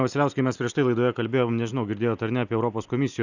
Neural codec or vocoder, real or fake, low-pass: none; real; 7.2 kHz